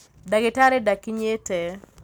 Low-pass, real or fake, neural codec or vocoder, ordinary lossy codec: none; real; none; none